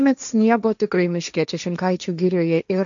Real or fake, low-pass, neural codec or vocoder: fake; 7.2 kHz; codec, 16 kHz, 1.1 kbps, Voila-Tokenizer